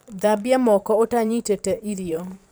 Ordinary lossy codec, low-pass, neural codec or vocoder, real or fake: none; none; vocoder, 44.1 kHz, 128 mel bands every 512 samples, BigVGAN v2; fake